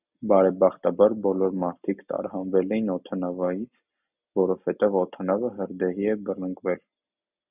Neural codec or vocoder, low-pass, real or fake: none; 3.6 kHz; real